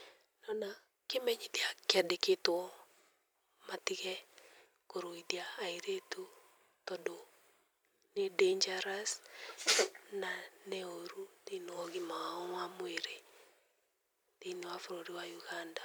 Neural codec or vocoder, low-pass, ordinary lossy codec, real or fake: vocoder, 44.1 kHz, 128 mel bands every 256 samples, BigVGAN v2; none; none; fake